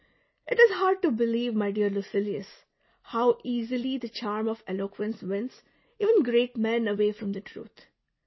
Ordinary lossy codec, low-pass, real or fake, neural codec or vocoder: MP3, 24 kbps; 7.2 kHz; real; none